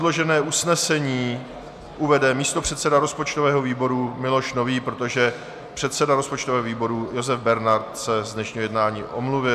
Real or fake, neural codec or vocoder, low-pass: real; none; 14.4 kHz